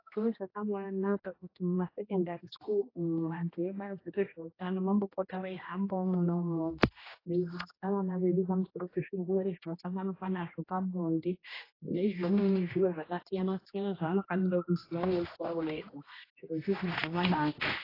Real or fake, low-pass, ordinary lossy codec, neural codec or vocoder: fake; 5.4 kHz; AAC, 24 kbps; codec, 16 kHz, 1 kbps, X-Codec, HuBERT features, trained on general audio